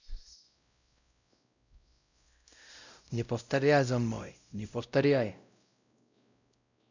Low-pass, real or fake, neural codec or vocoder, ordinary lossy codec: 7.2 kHz; fake; codec, 16 kHz, 0.5 kbps, X-Codec, WavLM features, trained on Multilingual LibriSpeech; none